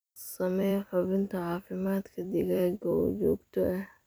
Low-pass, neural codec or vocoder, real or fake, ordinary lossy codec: none; vocoder, 44.1 kHz, 128 mel bands every 256 samples, BigVGAN v2; fake; none